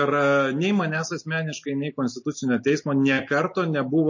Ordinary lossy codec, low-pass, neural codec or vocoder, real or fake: MP3, 32 kbps; 7.2 kHz; none; real